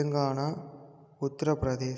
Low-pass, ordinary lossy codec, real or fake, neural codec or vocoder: none; none; real; none